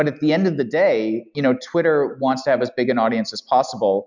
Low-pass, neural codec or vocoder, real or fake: 7.2 kHz; none; real